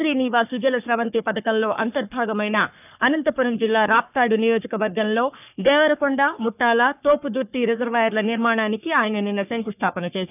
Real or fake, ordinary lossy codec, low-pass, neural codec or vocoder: fake; none; 3.6 kHz; codec, 44.1 kHz, 3.4 kbps, Pupu-Codec